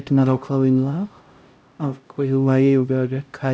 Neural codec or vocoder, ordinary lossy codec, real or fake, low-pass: codec, 16 kHz, 0.5 kbps, X-Codec, HuBERT features, trained on LibriSpeech; none; fake; none